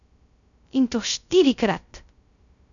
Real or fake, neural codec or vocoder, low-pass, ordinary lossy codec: fake; codec, 16 kHz, 0.2 kbps, FocalCodec; 7.2 kHz; none